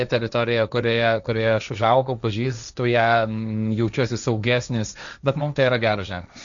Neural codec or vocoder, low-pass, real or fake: codec, 16 kHz, 1.1 kbps, Voila-Tokenizer; 7.2 kHz; fake